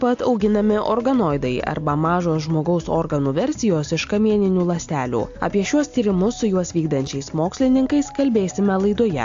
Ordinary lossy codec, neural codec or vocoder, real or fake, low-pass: AAC, 64 kbps; none; real; 7.2 kHz